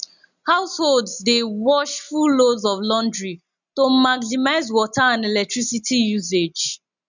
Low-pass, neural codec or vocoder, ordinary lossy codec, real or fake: 7.2 kHz; none; none; real